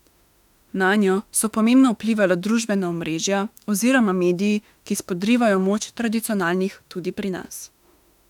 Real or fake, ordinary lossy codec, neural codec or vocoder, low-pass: fake; none; autoencoder, 48 kHz, 32 numbers a frame, DAC-VAE, trained on Japanese speech; 19.8 kHz